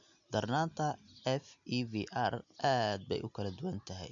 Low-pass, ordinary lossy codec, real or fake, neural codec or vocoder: 7.2 kHz; none; real; none